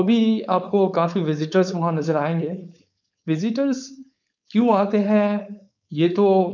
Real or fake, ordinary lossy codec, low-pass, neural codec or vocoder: fake; none; 7.2 kHz; codec, 16 kHz, 4.8 kbps, FACodec